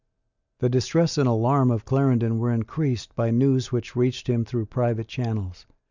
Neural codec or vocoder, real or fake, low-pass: none; real; 7.2 kHz